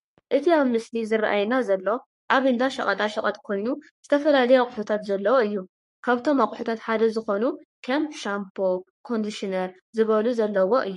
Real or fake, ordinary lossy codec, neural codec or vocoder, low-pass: fake; MP3, 48 kbps; codec, 44.1 kHz, 3.4 kbps, Pupu-Codec; 14.4 kHz